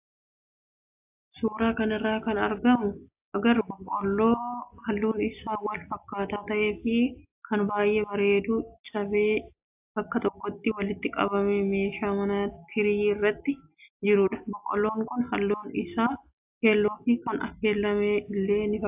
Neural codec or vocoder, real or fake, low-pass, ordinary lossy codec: none; real; 3.6 kHz; AAC, 32 kbps